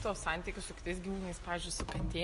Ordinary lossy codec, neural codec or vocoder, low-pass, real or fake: MP3, 48 kbps; none; 14.4 kHz; real